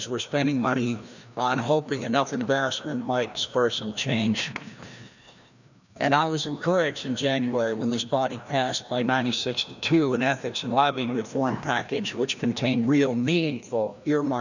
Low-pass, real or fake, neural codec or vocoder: 7.2 kHz; fake; codec, 16 kHz, 1 kbps, FreqCodec, larger model